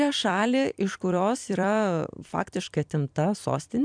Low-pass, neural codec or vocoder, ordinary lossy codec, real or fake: 9.9 kHz; vocoder, 44.1 kHz, 128 mel bands every 256 samples, BigVGAN v2; MP3, 96 kbps; fake